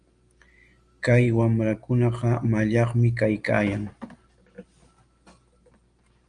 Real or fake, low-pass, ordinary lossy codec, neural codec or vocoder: real; 9.9 kHz; Opus, 32 kbps; none